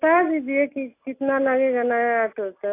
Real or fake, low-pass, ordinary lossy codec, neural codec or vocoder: real; 3.6 kHz; AAC, 24 kbps; none